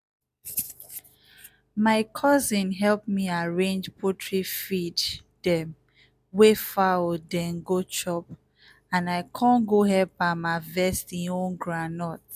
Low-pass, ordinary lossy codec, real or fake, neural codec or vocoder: 14.4 kHz; none; real; none